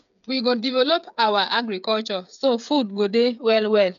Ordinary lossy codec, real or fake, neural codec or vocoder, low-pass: none; fake; codec, 16 kHz, 8 kbps, FreqCodec, smaller model; 7.2 kHz